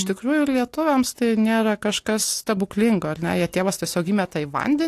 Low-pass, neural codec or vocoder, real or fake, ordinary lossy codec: 14.4 kHz; none; real; AAC, 64 kbps